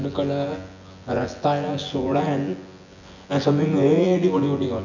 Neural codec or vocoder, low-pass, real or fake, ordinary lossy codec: vocoder, 24 kHz, 100 mel bands, Vocos; 7.2 kHz; fake; none